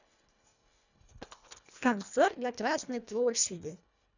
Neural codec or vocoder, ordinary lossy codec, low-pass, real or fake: codec, 24 kHz, 1.5 kbps, HILCodec; none; 7.2 kHz; fake